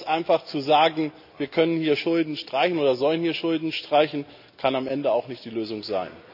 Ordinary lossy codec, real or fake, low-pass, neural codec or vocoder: none; real; 5.4 kHz; none